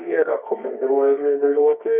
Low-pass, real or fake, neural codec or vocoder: 3.6 kHz; fake; codec, 24 kHz, 0.9 kbps, WavTokenizer, medium music audio release